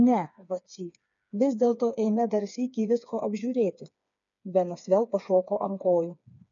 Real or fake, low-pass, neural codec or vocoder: fake; 7.2 kHz; codec, 16 kHz, 4 kbps, FreqCodec, smaller model